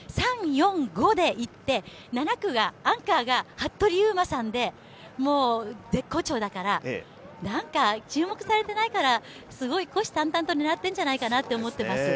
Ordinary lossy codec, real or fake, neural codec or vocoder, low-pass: none; real; none; none